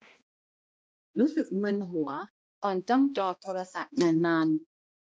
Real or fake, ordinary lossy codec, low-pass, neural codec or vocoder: fake; none; none; codec, 16 kHz, 1 kbps, X-Codec, HuBERT features, trained on balanced general audio